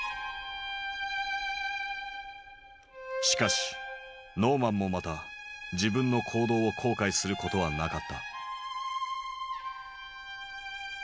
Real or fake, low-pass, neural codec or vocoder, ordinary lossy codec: real; none; none; none